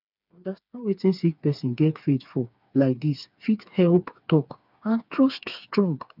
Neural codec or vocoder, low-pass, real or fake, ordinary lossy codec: codec, 16 kHz, 4 kbps, FreqCodec, smaller model; 5.4 kHz; fake; none